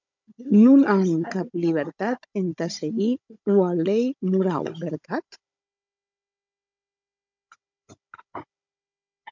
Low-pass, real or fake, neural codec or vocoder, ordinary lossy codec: 7.2 kHz; fake; codec, 16 kHz, 16 kbps, FunCodec, trained on Chinese and English, 50 frames a second; MP3, 64 kbps